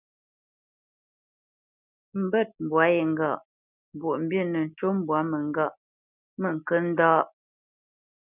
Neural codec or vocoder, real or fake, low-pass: none; real; 3.6 kHz